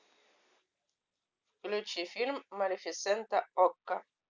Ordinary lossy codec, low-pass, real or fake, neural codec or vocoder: none; 7.2 kHz; real; none